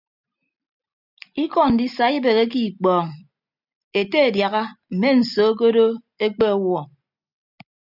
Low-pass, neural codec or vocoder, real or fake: 5.4 kHz; none; real